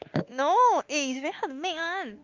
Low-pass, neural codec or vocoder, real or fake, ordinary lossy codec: 7.2 kHz; autoencoder, 48 kHz, 32 numbers a frame, DAC-VAE, trained on Japanese speech; fake; Opus, 24 kbps